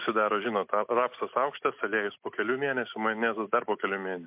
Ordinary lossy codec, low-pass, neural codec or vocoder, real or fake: MP3, 32 kbps; 3.6 kHz; none; real